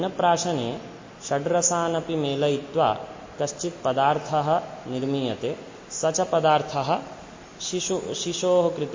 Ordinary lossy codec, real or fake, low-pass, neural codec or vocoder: MP3, 32 kbps; real; 7.2 kHz; none